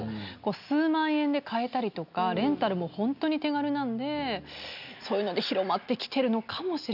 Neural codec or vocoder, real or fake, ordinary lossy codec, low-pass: none; real; none; 5.4 kHz